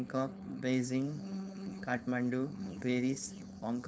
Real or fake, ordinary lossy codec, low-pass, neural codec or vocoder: fake; none; none; codec, 16 kHz, 4.8 kbps, FACodec